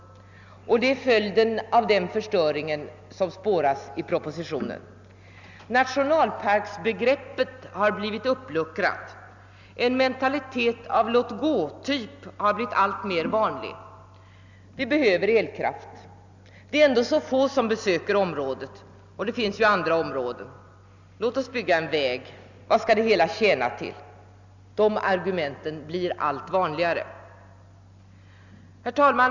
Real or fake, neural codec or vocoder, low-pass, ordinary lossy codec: real; none; 7.2 kHz; Opus, 64 kbps